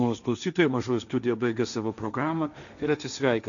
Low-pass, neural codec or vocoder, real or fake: 7.2 kHz; codec, 16 kHz, 1.1 kbps, Voila-Tokenizer; fake